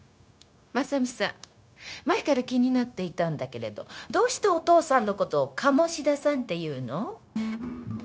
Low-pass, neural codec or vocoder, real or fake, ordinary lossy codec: none; codec, 16 kHz, 0.9 kbps, LongCat-Audio-Codec; fake; none